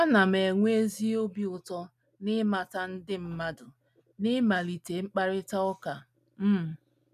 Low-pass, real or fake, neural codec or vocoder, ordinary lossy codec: 14.4 kHz; real; none; none